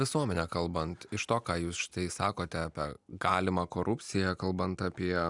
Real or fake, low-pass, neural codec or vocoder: real; 10.8 kHz; none